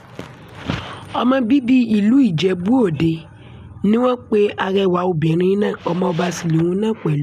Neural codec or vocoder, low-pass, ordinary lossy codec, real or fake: none; 14.4 kHz; none; real